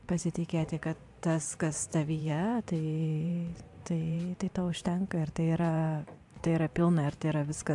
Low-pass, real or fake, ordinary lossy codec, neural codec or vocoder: 10.8 kHz; fake; AAC, 64 kbps; vocoder, 44.1 kHz, 128 mel bands every 512 samples, BigVGAN v2